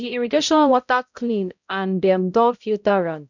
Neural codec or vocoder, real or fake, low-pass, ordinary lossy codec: codec, 16 kHz, 0.5 kbps, X-Codec, HuBERT features, trained on balanced general audio; fake; 7.2 kHz; none